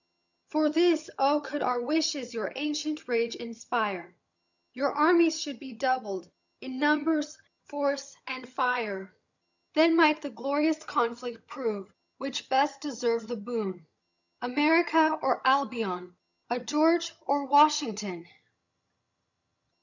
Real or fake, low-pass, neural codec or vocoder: fake; 7.2 kHz; vocoder, 22.05 kHz, 80 mel bands, HiFi-GAN